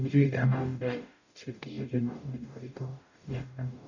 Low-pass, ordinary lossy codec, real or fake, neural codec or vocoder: 7.2 kHz; none; fake; codec, 44.1 kHz, 0.9 kbps, DAC